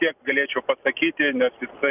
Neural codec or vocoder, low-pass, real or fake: none; 3.6 kHz; real